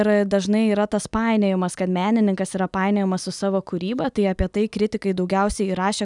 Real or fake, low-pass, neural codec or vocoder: real; 10.8 kHz; none